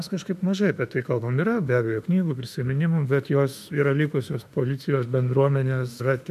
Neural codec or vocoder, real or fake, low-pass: autoencoder, 48 kHz, 32 numbers a frame, DAC-VAE, trained on Japanese speech; fake; 14.4 kHz